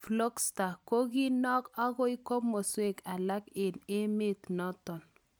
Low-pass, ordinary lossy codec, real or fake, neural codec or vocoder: none; none; real; none